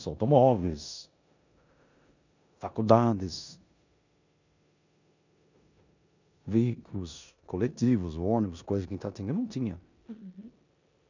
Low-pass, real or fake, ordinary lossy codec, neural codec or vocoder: 7.2 kHz; fake; none; codec, 16 kHz in and 24 kHz out, 0.9 kbps, LongCat-Audio-Codec, four codebook decoder